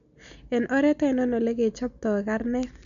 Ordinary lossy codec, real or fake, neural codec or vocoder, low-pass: none; real; none; 7.2 kHz